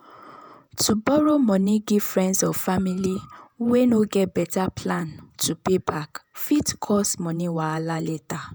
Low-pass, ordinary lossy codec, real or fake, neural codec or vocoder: none; none; fake; vocoder, 48 kHz, 128 mel bands, Vocos